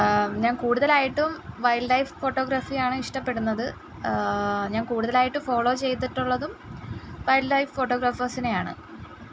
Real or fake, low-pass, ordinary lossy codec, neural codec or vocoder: real; none; none; none